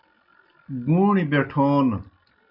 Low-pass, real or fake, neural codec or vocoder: 5.4 kHz; real; none